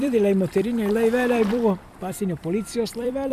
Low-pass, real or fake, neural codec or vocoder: 14.4 kHz; fake; vocoder, 44.1 kHz, 128 mel bands every 256 samples, BigVGAN v2